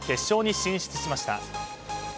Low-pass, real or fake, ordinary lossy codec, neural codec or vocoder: none; real; none; none